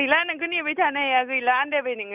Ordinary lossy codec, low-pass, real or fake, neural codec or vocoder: none; 3.6 kHz; real; none